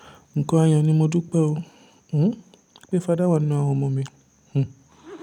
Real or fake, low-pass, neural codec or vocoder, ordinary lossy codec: fake; 19.8 kHz; vocoder, 44.1 kHz, 128 mel bands every 256 samples, BigVGAN v2; none